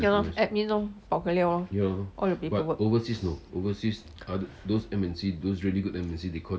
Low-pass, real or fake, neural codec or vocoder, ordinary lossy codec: none; real; none; none